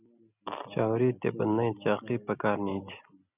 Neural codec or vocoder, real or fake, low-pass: none; real; 3.6 kHz